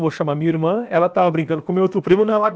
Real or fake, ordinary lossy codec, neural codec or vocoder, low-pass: fake; none; codec, 16 kHz, about 1 kbps, DyCAST, with the encoder's durations; none